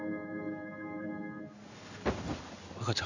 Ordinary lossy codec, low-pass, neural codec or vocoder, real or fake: none; 7.2 kHz; codec, 16 kHz in and 24 kHz out, 1 kbps, XY-Tokenizer; fake